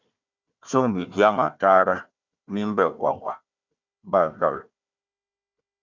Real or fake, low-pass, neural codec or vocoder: fake; 7.2 kHz; codec, 16 kHz, 1 kbps, FunCodec, trained on Chinese and English, 50 frames a second